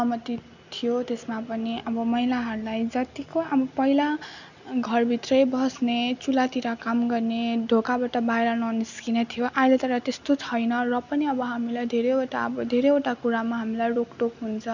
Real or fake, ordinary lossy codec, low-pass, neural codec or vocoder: real; none; 7.2 kHz; none